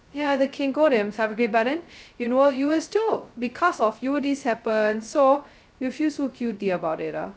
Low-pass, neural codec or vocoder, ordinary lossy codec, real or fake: none; codec, 16 kHz, 0.2 kbps, FocalCodec; none; fake